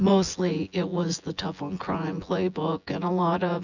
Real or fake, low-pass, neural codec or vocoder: fake; 7.2 kHz; vocoder, 24 kHz, 100 mel bands, Vocos